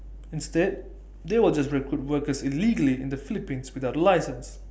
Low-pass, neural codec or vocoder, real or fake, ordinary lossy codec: none; none; real; none